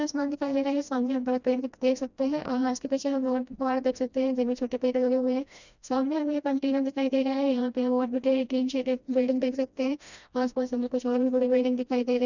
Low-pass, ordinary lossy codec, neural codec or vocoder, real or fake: 7.2 kHz; none; codec, 16 kHz, 1 kbps, FreqCodec, smaller model; fake